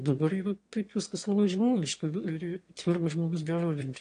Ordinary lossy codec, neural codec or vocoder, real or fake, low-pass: AAC, 48 kbps; autoencoder, 22.05 kHz, a latent of 192 numbers a frame, VITS, trained on one speaker; fake; 9.9 kHz